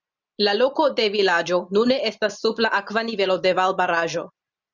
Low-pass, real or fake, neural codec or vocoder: 7.2 kHz; real; none